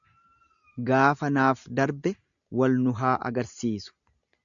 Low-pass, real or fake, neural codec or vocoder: 7.2 kHz; real; none